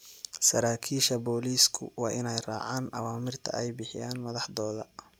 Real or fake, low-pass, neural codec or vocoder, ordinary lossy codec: real; none; none; none